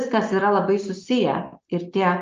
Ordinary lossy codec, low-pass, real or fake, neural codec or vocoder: Opus, 24 kbps; 7.2 kHz; real; none